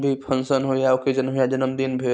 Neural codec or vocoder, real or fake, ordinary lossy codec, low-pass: none; real; none; none